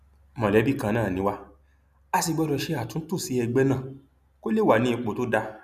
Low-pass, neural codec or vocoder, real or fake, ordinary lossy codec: 14.4 kHz; none; real; none